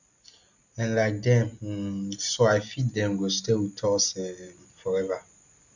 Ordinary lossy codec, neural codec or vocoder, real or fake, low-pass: none; none; real; 7.2 kHz